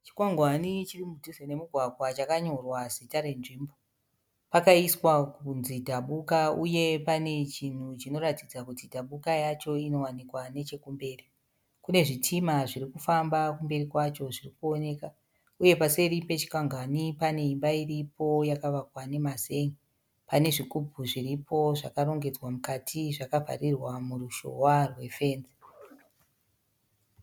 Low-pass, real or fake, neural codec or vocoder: 19.8 kHz; real; none